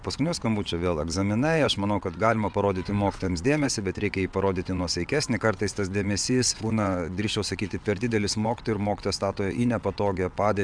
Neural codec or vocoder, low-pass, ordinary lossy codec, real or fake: vocoder, 22.05 kHz, 80 mel bands, WaveNeXt; 9.9 kHz; MP3, 96 kbps; fake